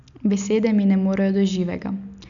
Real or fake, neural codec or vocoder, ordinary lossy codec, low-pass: real; none; none; 7.2 kHz